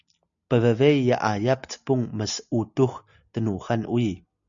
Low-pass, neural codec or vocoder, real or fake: 7.2 kHz; none; real